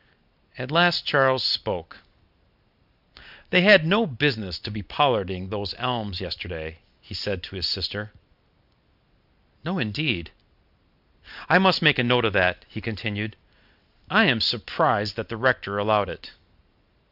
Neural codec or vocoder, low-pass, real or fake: none; 5.4 kHz; real